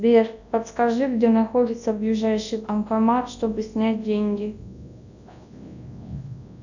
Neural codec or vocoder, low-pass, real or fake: codec, 24 kHz, 0.9 kbps, WavTokenizer, large speech release; 7.2 kHz; fake